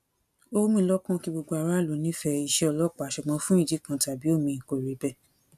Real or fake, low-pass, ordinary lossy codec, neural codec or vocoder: real; 14.4 kHz; none; none